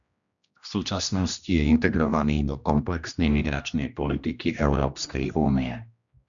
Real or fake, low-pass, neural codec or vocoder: fake; 7.2 kHz; codec, 16 kHz, 1 kbps, X-Codec, HuBERT features, trained on general audio